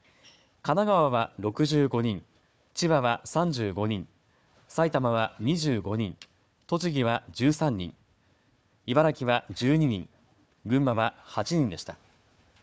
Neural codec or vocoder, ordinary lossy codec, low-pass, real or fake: codec, 16 kHz, 4 kbps, FunCodec, trained on Chinese and English, 50 frames a second; none; none; fake